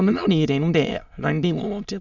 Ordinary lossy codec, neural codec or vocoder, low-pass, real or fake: none; autoencoder, 22.05 kHz, a latent of 192 numbers a frame, VITS, trained on many speakers; 7.2 kHz; fake